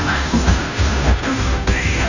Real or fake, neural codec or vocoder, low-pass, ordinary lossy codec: fake; codec, 16 kHz, 0.5 kbps, FunCodec, trained on Chinese and English, 25 frames a second; 7.2 kHz; none